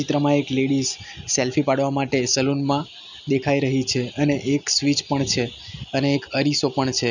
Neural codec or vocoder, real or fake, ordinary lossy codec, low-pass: none; real; none; 7.2 kHz